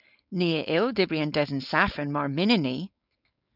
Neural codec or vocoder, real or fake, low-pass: codec, 16 kHz, 4.8 kbps, FACodec; fake; 5.4 kHz